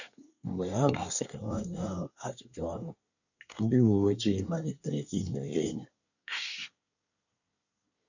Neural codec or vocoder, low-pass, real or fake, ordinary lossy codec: codec, 24 kHz, 1 kbps, SNAC; 7.2 kHz; fake; none